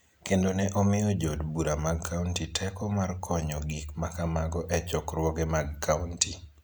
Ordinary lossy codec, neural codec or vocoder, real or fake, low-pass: none; none; real; none